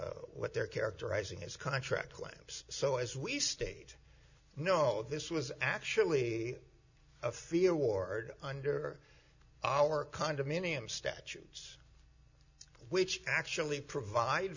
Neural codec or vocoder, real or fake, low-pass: none; real; 7.2 kHz